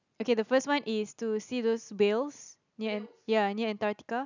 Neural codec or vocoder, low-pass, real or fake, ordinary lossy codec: none; 7.2 kHz; real; none